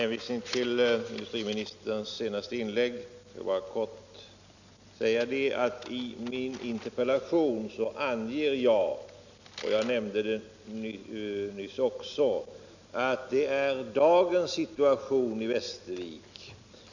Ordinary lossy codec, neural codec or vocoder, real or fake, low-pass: none; none; real; 7.2 kHz